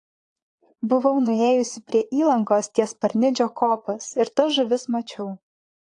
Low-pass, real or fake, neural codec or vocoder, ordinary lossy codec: 9.9 kHz; fake; vocoder, 22.05 kHz, 80 mel bands, Vocos; AAC, 48 kbps